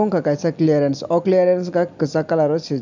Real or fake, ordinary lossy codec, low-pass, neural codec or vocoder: real; none; 7.2 kHz; none